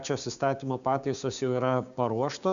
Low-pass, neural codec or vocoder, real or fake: 7.2 kHz; codec, 16 kHz, 6 kbps, DAC; fake